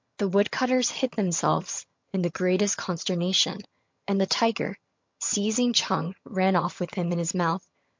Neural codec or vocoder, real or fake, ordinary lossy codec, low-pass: vocoder, 22.05 kHz, 80 mel bands, HiFi-GAN; fake; MP3, 48 kbps; 7.2 kHz